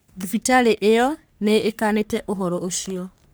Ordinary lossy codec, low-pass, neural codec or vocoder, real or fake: none; none; codec, 44.1 kHz, 3.4 kbps, Pupu-Codec; fake